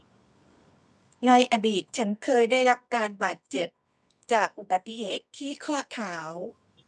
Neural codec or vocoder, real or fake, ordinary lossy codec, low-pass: codec, 24 kHz, 0.9 kbps, WavTokenizer, medium music audio release; fake; none; none